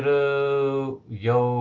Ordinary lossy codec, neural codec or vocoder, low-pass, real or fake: Opus, 32 kbps; none; 7.2 kHz; real